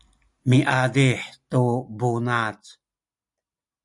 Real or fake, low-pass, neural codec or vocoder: real; 10.8 kHz; none